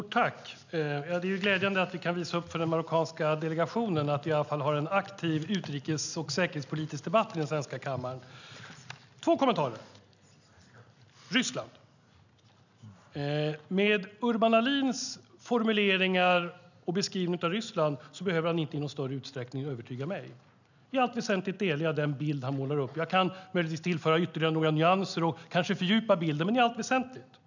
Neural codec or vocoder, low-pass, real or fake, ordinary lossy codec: none; 7.2 kHz; real; none